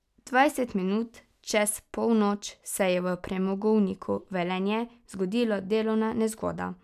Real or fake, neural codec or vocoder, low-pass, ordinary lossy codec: real; none; 14.4 kHz; none